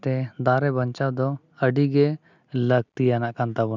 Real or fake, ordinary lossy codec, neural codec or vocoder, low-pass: real; none; none; 7.2 kHz